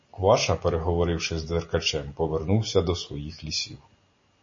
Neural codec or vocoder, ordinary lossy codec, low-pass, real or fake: none; MP3, 32 kbps; 7.2 kHz; real